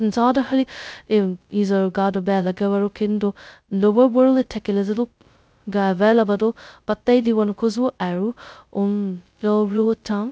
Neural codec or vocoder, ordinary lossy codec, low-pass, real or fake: codec, 16 kHz, 0.2 kbps, FocalCodec; none; none; fake